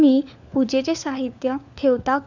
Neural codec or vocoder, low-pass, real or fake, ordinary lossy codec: codec, 16 kHz, 4 kbps, FunCodec, trained on LibriTTS, 50 frames a second; 7.2 kHz; fake; none